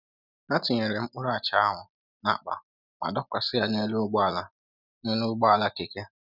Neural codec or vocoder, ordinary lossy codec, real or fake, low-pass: none; none; real; 5.4 kHz